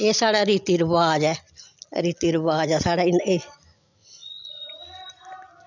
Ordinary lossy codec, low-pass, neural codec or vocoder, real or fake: none; 7.2 kHz; none; real